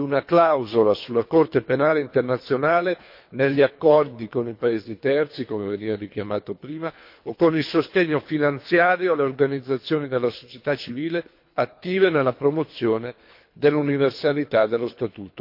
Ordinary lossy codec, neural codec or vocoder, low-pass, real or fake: MP3, 32 kbps; codec, 24 kHz, 3 kbps, HILCodec; 5.4 kHz; fake